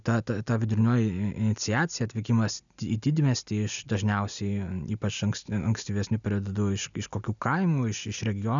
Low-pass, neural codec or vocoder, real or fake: 7.2 kHz; none; real